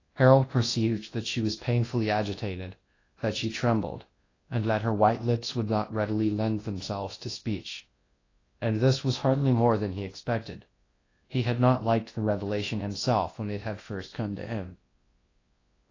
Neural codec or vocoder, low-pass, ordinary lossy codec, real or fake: codec, 24 kHz, 0.9 kbps, WavTokenizer, large speech release; 7.2 kHz; AAC, 32 kbps; fake